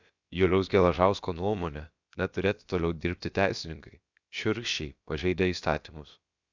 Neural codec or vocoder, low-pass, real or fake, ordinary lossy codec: codec, 16 kHz, about 1 kbps, DyCAST, with the encoder's durations; 7.2 kHz; fake; Opus, 64 kbps